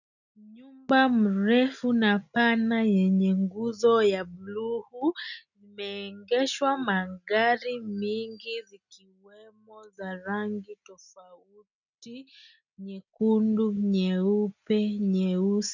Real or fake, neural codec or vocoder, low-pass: real; none; 7.2 kHz